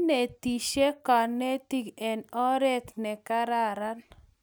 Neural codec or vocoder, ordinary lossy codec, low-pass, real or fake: none; none; none; real